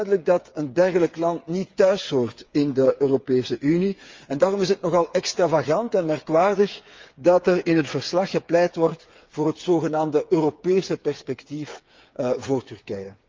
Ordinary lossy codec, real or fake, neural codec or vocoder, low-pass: Opus, 32 kbps; fake; codec, 16 kHz, 8 kbps, FreqCodec, smaller model; 7.2 kHz